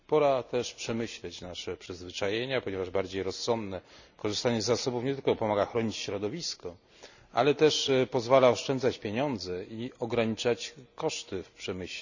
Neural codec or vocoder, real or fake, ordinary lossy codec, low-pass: none; real; none; 7.2 kHz